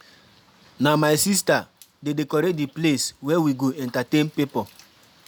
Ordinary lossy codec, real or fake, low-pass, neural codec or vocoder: none; real; none; none